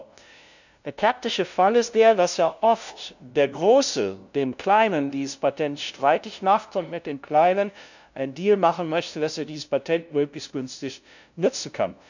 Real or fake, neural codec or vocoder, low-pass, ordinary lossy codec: fake; codec, 16 kHz, 0.5 kbps, FunCodec, trained on LibriTTS, 25 frames a second; 7.2 kHz; none